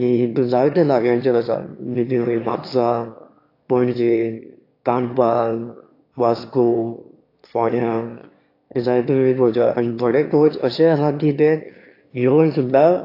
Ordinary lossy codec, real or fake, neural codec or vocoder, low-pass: AAC, 32 kbps; fake; autoencoder, 22.05 kHz, a latent of 192 numbers a frame, VITS, trained on one speaker; 5.4 kHz